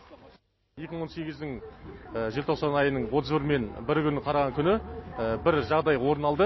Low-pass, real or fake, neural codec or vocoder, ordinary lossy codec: 7.2 kHz; real; none; MP3, 24 kbps